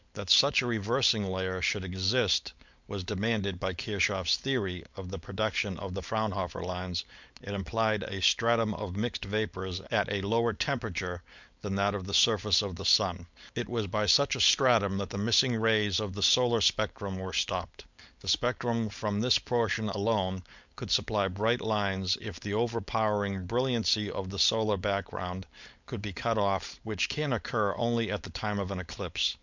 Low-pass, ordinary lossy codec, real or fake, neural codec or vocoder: 7.2 kHz; MP3, 64 kbps; fake; codec, 16 kHz, 4.8 kbps, FACodec